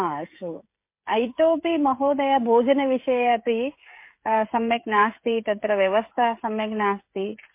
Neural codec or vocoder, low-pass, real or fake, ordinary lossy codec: codec, 16 kHz, 16 kbps, FreqCodec, smaller model; 3.6 kHz; fake; MP3, 24 kbps